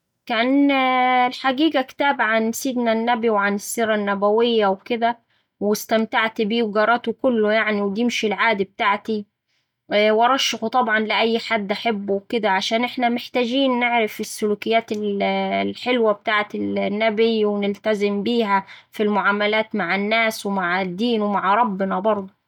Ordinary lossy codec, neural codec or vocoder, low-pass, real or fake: none; none; 19.8 kHz; real